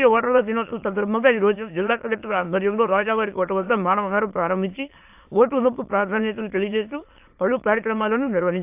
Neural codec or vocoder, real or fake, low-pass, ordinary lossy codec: autoencoder, 22.05 kHz, a latent of 192 numbers a frame, VITS, trained on many speakers; fake; 3.6 kHz; AAC, 32 kbps